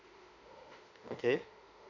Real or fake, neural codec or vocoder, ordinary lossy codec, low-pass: fake; autoencoder, 48 kHz, 32 numbers a frame, DAC-VAE, trained on Japanese speech; none; 7.2 kHz